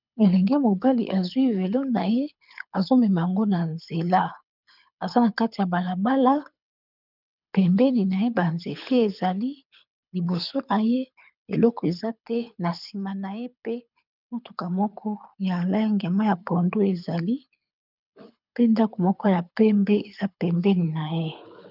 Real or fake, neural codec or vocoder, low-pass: fake; codec, 24 kHz, 6 kbps, HILCodec; 5.4 kHz